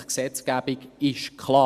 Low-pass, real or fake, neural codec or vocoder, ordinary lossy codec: 14.4 kHz; real; none; Opus, 64 kbps